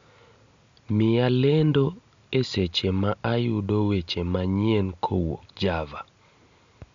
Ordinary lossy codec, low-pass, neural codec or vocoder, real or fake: MP3, 64 kbps; 7.2 kHz; none; real